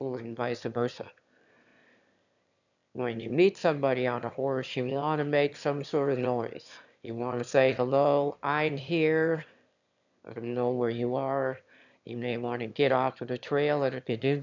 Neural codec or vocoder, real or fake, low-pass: autoencoder, 22.05 kHz, a latent of 192 numbers a frame, VITS, trained on one speaker; fake; 7.2 kHz